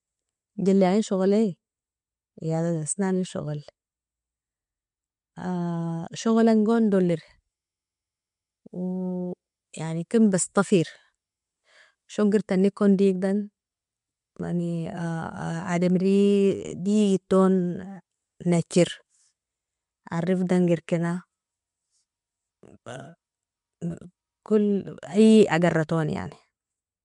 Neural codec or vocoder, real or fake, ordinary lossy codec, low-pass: none; real; MP3, 64 kbps; 10.8 kHz